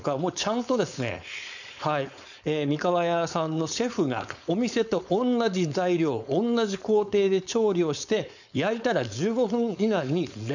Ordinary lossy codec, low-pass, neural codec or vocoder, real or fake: none; 7.2 kHz; codec, 16 kHz, 4.8 kbps, FACodec; fake